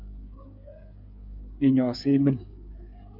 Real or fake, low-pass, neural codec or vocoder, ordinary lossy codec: fake; 5.4 kHz; codec, 16 kHz, 8 kbps, FreqCodec, smaller model; MP3, 48 kbps